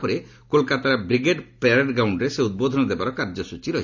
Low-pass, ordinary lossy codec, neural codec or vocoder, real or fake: 7.2 kHz; none; none; real